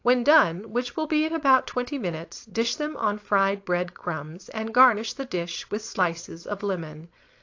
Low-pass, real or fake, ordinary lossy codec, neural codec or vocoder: 7.2 kHz; fake; AAC, 48 kbps; codec, 16 kHz, 4.8 kbps, FACodec